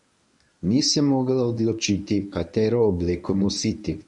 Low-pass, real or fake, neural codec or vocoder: 10.8 kHz; fake; codec, 24 kHz, 0.9 kbps, WavTokenizer, medium speech release version 1